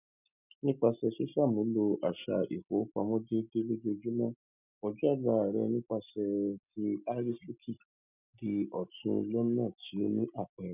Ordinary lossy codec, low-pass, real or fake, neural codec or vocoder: none; 3.6 kHz; real; none